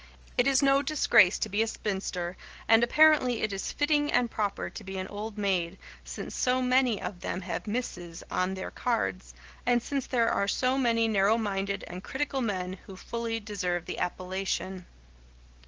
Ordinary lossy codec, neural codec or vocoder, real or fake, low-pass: Opus, 16 kbps; none; real; 7.2 kHz